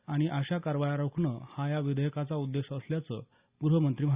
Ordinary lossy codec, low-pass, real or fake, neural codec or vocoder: Opus, 64 kbps; 3.6 kHz; real; none